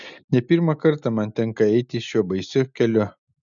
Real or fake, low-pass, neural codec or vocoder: real; 7.2 kHz; none